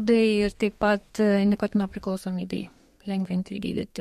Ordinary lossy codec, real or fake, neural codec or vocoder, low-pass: MP3, 64 kbps; fake; codec, 32 kHz, 1.9 kbps, SNAC; 14.4 kHz